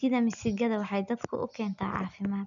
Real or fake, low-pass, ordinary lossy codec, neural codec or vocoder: real; 7.2 kHz; none; none